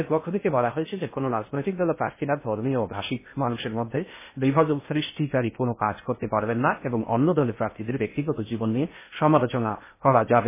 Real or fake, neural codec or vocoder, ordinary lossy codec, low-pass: fake; codec, 16 kHz in and 24 kHz out, 0.6 kbps, FocalCodec, streaming, 2048 codes; MP3, 16 kbps; 3.6 kHz